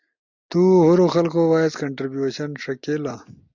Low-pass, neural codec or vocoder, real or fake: 7.2 kHz; none; real